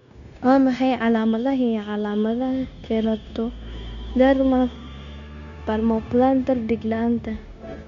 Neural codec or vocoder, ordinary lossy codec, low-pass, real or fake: codec, 16 kHz, 0.9 kbps, LongCat-Audio-Codec; none; 7.2 kHz; fake